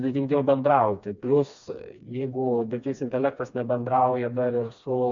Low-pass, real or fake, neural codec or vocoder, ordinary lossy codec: 7.2 kHz; fake; codec, 16 kHz, 2 kbps, FreqCodec, smaller model; MP3, 64 kbps